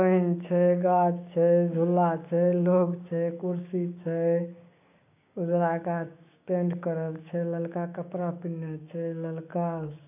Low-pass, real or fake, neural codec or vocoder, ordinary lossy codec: 3.6 kHz; fake; codec, 16 kHz, 6 kbps, DAC; AAC, 32 kbps